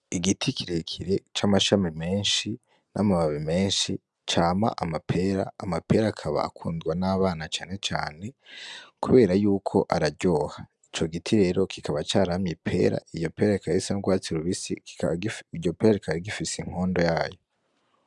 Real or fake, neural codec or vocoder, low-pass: real; none; 10.8 kHz